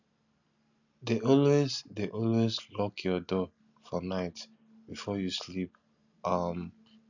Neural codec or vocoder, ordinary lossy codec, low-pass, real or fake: none; none; 7.2 kHz; real